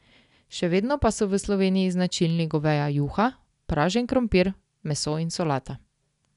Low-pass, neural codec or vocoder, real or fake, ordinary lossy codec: 10.8 kHz; none; real; none